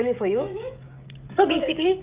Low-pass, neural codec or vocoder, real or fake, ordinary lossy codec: 3.6 kHz; codec, 16 kHz, 16 kbps, FreqCodec, smaller model; fake; Opus, 24 kbps